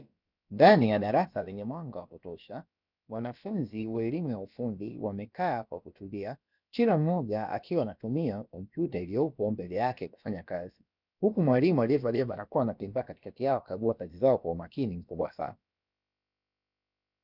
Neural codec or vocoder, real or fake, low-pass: codec, 16 kHz, about 1 kbps, DyCAST, with the encoder's durations; fake; 5.4 kHz